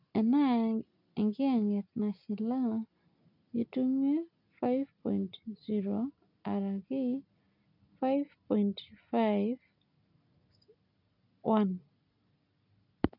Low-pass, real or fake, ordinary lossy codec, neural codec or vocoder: 5.4 kHz; real; none; none